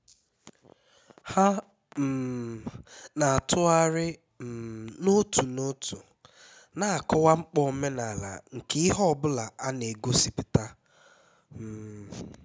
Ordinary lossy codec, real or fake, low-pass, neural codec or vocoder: none; real; none; none